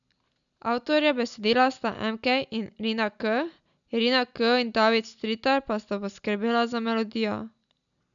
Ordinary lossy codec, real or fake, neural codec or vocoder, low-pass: none; real; none; 7.2 kHz